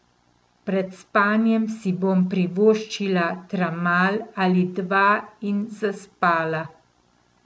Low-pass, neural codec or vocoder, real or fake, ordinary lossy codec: none; none; real; none